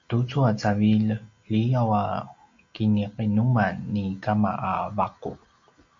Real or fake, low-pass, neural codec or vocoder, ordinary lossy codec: real; 7.2 kHz; none; MP3, 48 kbps